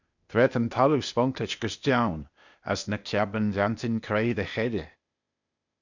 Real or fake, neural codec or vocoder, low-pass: fake; codec, 16 kHz, 0.8 kbps, ZipCodec; 7.2 kHz